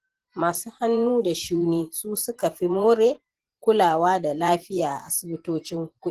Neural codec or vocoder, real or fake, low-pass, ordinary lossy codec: vocoder, 44.1 kHz, 128 mel bands every 512 samples, BigVGAN v2; fake; 14.4 kHz; Opus, 16 kbps